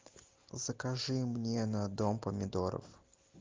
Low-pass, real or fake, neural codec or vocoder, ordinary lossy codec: 7.2 kHz; real; none; Opus, 32 kbps